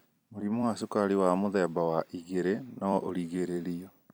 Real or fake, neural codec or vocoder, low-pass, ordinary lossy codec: fake; vocoder, 44.1 kHz, 128 mel bands every 256 samples, BigVGAN v2; none; none